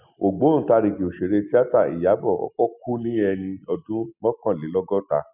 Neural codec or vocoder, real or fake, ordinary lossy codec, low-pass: none; real; none; 3.6 kHz